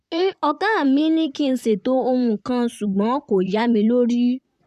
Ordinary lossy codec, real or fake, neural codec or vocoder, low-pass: none; fake; vocoder, 44.1 kHz, 128 mel bands, Pupu-Vocoder; 14.4 kHz